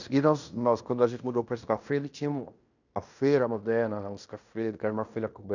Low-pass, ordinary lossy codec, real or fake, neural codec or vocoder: 7.2 kHz; none; fake; codec, 16 kHz in and 24 kHz out, 0.9 kbps, LongCat-Audio-Codec, fine tuned four codebook decoder